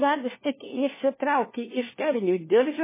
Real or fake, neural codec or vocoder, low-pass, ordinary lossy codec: fake; codec, 16 kHz, 1 kbps, FunCodec, trained on LibriTTS, 50 frames a second; 3.6 kHz; MP3, 16 kbps